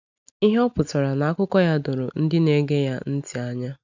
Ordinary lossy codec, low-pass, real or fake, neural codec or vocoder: none; 7.2 kHz; real; none